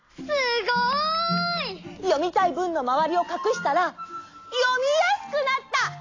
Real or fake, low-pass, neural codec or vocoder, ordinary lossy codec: real; 7.2 kHz; none; AAC, 32 kbps